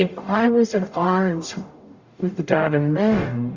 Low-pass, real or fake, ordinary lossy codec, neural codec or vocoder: 7.2 kHz; fake; Opus, 64 kbps; codec, 44.1 kHz, 0.9 kbps, DAC